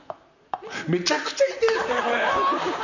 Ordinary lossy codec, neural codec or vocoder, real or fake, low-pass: none; vocoder, 44.1 kHz, 128 mel bands, Pupu-Vocoder; fake; 7.2 kHz